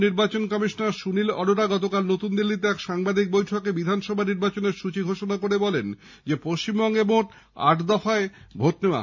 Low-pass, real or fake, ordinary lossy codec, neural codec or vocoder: 7.2 kHz; real; MP3, 64 kbps; none